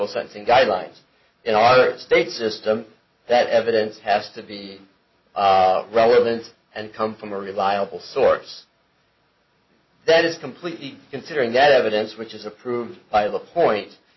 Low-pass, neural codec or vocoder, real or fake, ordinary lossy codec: 7.2 kHz; none; real; MP3, 24 kbps